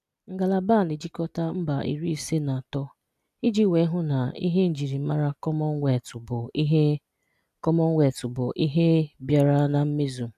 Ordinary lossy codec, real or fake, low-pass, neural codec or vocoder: none; real; 14.4 kHz; none